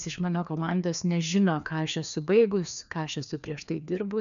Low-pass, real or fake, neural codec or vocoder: 7.2 kHz; fake; codec, 16 kHz, 2 kbps, FreqCodec, larger model